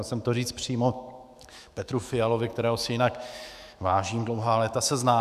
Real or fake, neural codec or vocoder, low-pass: fake; autoencoder, 48 kHz, 128 numbers a frame, DAC-VAE, trained on Japanese speech; 14.4 kHz